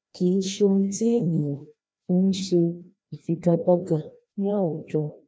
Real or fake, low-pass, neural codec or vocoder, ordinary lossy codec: fake; none; codec, 16 kHz, 1 kbps, FreqCodec, larger model; none